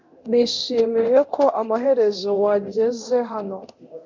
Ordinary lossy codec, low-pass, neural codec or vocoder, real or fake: MP3, 64 kbps; 7.2 kHz; codec, 24 kHz, 0.9 kbps, DualCodec; fake